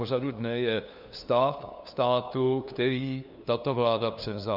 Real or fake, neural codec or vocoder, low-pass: fake; codec, 16 kHz, 2 kbps, FunCodec, trained on LibriTTS, 25 frames a second; 5.4 kHz